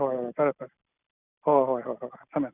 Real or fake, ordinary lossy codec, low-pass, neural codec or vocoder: real; none; 3.6 kHz; none